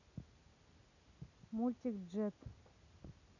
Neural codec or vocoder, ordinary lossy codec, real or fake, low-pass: none; none; real; 7.2 kHz